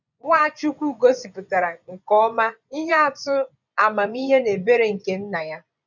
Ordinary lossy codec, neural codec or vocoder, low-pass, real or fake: none; vocoder, 24 kHz, 100 mel bands, Vocos; 7.2 kHz; fake